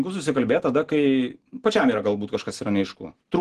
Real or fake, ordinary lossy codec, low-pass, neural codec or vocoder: real; Opus, 16 kbps; 10.8 kHz; none